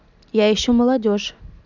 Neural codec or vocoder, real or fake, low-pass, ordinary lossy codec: none; real; 7.2 kHz; none